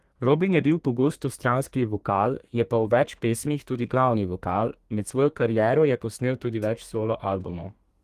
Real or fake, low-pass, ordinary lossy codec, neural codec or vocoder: fake; 14.4 kHz; Opus, 24 kbps; codec, 32 kHz, 1.9 kbps, SNAC